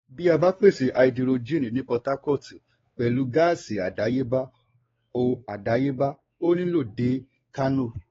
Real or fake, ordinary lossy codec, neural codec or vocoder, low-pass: fake; AAC, 24 kbps; codec, 16 kHz, 4 kbps, X-Codec, WavLM features, trained on Multilingual LibriSpeech; 7.2 kHz